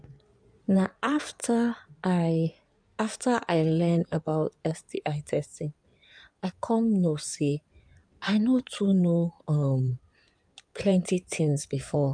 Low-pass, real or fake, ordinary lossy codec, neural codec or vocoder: 9.9 kHz; fake; none; codec, 16 kHz in and 24 kHz out, 2.2 kbps, FireRedTTS-2 codec